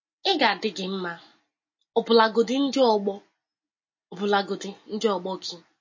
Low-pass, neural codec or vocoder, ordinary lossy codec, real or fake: 7.2 kHz; none; MP3, 32 kbps; real